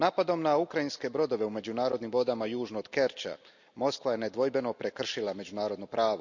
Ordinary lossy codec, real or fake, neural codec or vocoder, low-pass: none; real; none; 7.2 kHz